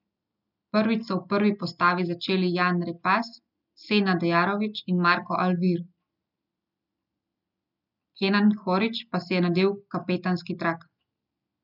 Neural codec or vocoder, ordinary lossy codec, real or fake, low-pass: none; none; real; 5.4 kHz